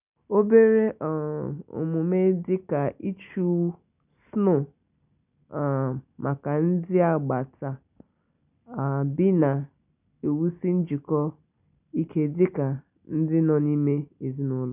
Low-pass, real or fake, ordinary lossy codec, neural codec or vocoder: 3.6 kHz; real; none; none